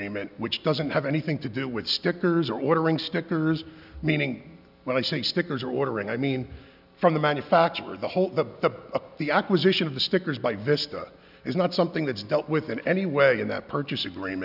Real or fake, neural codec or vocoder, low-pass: real; none; 5.4 kHz